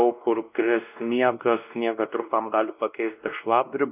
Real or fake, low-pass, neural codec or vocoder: fake; 3.6 kHz; codec, 16 kHz, 1 kbps, X-Codec, WavLM features, trained on Multilingual LibriSpeech